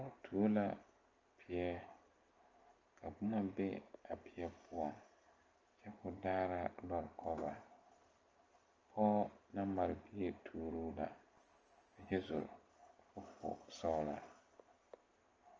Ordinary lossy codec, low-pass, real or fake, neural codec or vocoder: Opus, 24 kbps; 7.2 kHz; real; none